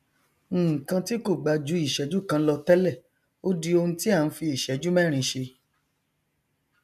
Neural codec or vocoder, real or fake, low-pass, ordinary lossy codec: none; real; 14.4 kHz; none